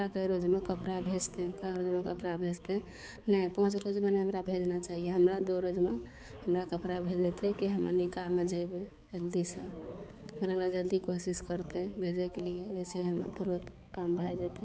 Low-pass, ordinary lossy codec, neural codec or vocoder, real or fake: none; none; codec, 16 kHz, 4 kbps, X-Codec, HuBERT features, trained on balanced general audio; fake